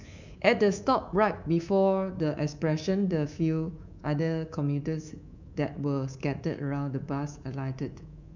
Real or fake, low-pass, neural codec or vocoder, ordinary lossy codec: fake; 7.2 kHz; codec, 24 kHz, 3.1 kbps, DualCodec; none